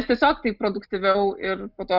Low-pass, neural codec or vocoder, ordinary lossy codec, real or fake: 5.4 kHz; none; Opus, 64 kbps; real